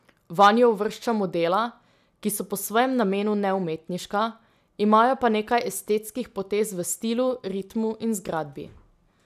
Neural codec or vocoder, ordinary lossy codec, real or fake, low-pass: none; none; real; 14.4 kHz